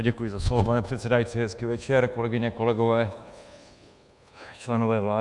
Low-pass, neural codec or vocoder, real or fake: 10.8 kHz; codec, 24 kHz, 1.2 kbps, DualCodec; fake